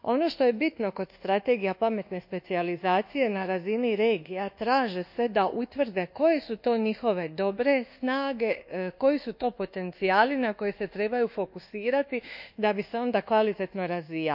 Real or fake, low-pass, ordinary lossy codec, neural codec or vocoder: fake; 5.4 kHz; none; codec, 24 kHz, 1.2 kbps, DualCodec